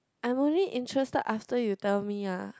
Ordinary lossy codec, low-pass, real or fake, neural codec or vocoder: none; none; real; none